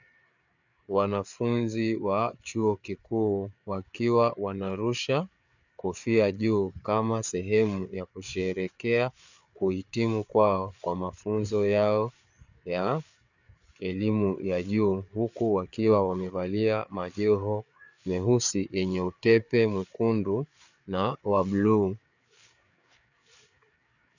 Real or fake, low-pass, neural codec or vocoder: fake; 7.2 kHz; codec, 16 kHz, 4 kbps, FreqCodec, larger model